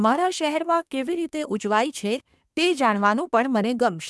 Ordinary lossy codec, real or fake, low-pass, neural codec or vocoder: none; fake; none; codec, 24 kHz, 1 kbps, SNAC